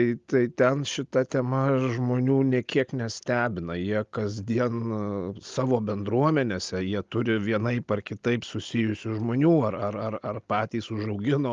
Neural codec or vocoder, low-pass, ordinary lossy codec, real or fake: none; 7.2 kHz; Opus, 32 kbps; real